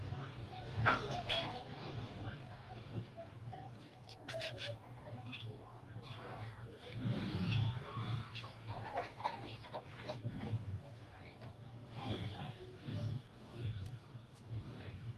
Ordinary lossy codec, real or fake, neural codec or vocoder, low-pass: Opus, 32 kbps; fake; codec, 44.1 kHz, 2.6 kbps, DAC; 14.4 kHz